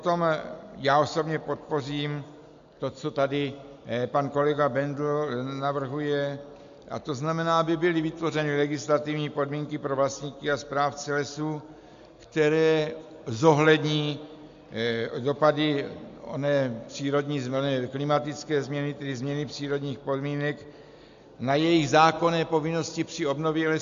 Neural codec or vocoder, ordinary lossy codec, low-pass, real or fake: none; AAC, 64 kbps; 7.2 kHz; real